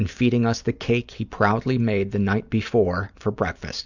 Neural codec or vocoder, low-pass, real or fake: none; 7.2 kHz; real